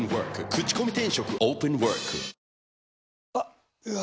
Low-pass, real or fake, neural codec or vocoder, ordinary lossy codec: none; real; none; none